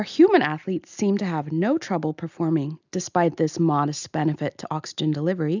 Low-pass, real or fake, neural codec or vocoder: 7.2 kHz; real; none